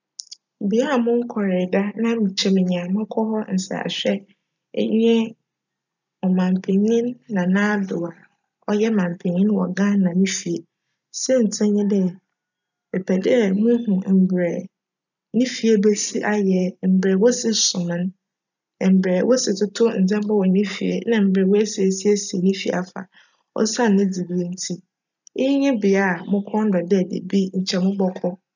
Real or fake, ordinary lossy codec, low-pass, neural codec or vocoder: real; none; 7.2 kHz; none